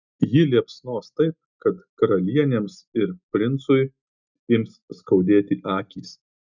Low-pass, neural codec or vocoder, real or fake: 7.2 kHz; none; real